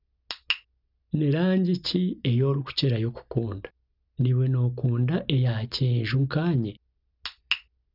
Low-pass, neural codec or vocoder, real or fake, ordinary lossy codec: 5.4 kHz; none; real; none